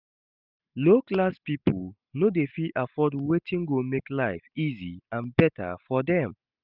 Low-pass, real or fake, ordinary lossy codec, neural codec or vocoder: 5.4 kHz; real; none; none